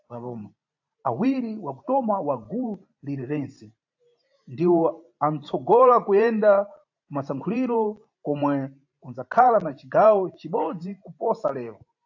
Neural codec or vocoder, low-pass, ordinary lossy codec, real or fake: vocoder, 44.1 kHz, 128 mel bands every 512 samples, BigVGAN v2; 7.2 kHz; AAC, 48 kbps; fake